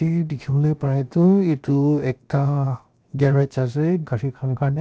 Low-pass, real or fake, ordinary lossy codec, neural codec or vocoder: none; fake; none; codec, 16 kHz, 0.7 kbps, FocalCodec